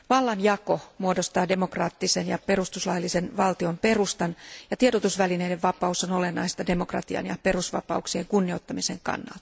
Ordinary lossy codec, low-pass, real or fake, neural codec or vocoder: none; none; real; none